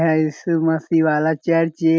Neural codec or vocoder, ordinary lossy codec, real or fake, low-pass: none; none; real; none